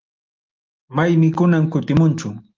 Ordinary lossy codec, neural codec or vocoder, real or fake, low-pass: Opus, 32 kbps; none; real; 7.2 kHz